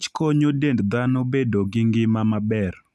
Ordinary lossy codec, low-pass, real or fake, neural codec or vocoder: none; none; real; none